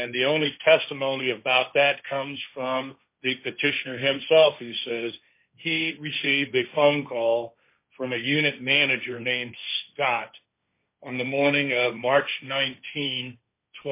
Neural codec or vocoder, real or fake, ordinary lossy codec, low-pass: codec, 16 kHz, 1.1 kbps, Voila-Tokenizer; fake; MP3, 24 kbps; 3.6 kHz